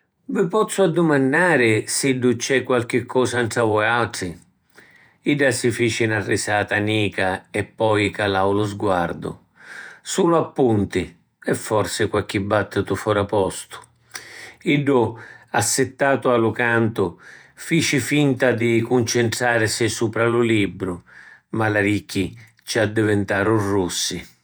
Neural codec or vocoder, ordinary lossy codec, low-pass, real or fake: vocoder, 48 kHz, 128 mel bands, Vocos; none; none; fake